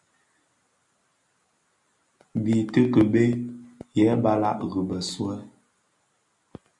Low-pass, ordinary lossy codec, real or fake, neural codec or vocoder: 10.8 kHz; MP3, 96 kbps; real; none